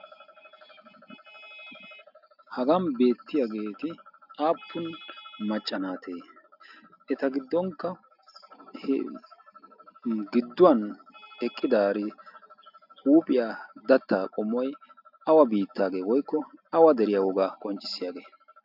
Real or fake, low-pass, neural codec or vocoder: real; 5.4 kHz; none